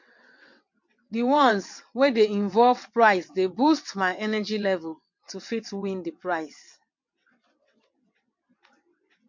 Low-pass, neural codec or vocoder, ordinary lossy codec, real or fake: 7.2 kHz; vocoder, 22.05 kHz, 80 mel bands, WaveNeXt; MP3, 48 kbps; fake